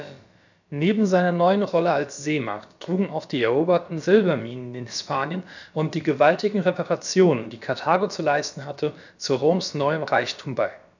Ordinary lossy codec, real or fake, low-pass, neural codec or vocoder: none; fake; 7.2 kHz; codec, 16 kHz, about 1 kbps, DyCAST, with the encoder's durations